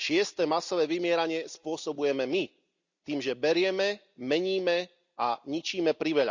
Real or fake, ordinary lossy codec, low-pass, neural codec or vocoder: real; Opus, 64 kbps; 7.2 kHz; none